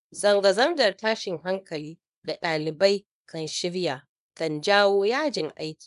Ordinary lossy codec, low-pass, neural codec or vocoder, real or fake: none; 10.8 kHz; codec, 24 kHz, 0.9 kbps, WavTokenizer, small release; fake